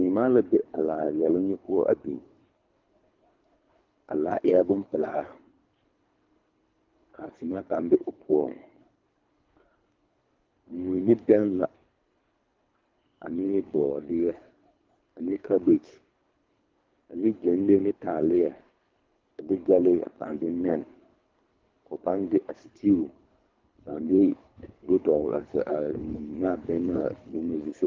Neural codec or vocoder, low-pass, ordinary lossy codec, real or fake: codec, 24 kHz, 3 kbps, HILCodec; 7.2 kHz; Opus, 32 kbps; fake